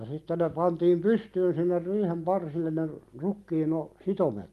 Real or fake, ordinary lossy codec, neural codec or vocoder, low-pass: real; Opus, 32 kbps; none; 14.4 kHz